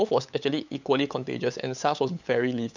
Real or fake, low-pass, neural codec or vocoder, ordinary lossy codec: fake; 7.2 kHz; codec, 16 kHz, 8 kbps, FunCodec, trained on LibriTTS, 25 frames a second; none